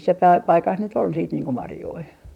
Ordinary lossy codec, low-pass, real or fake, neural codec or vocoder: none; 19.8 kHz; fake; vocoder, 44.1 kHz, 128 mel bands, Pupu-Vocoder